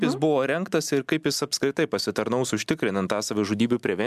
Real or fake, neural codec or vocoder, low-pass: real; none; 14.4 kHz